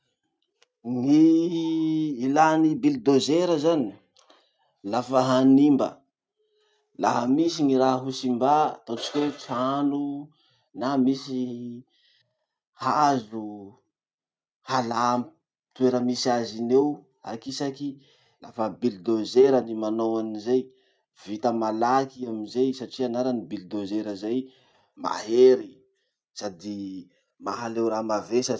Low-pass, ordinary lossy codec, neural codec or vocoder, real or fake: none; none; none; real